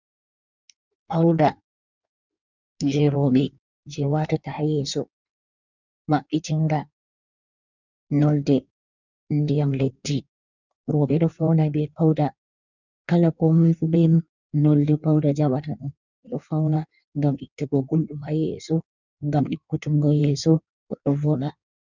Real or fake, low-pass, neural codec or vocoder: fake; 7.2 kHz; codec, 16 kHz in and 24 kHz out, 1.1 kbps, FireRedTTS-2 codec